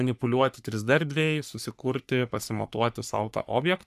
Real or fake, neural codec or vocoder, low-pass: fake; codec, 44.1 kHz, 3.4 kbps, Pupu-Codec; 14.4 kHz